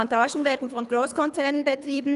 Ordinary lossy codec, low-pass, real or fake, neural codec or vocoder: none; 10.8 kHz; fake; codec, 24 kHz, 3 kbps, HILCodec